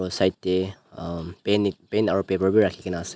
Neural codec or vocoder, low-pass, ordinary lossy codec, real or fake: none; none; none; real